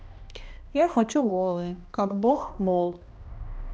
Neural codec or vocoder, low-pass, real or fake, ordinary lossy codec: codec, 16 kHz, 1 kbps, X-Codec, HuBERT features, trained on balanced general audio; none; fake; none